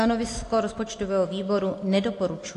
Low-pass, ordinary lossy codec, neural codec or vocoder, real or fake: 10.8 kHz; AAC, 48 kbps; none; real